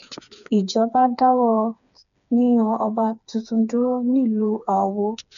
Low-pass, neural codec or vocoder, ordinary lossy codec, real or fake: 7.2 kHz; codec, 16 kHz, 4 kbps, FreqCodec, smaller model; none; fake